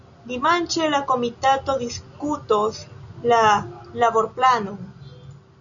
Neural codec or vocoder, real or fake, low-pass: none; real; 7.2 kHz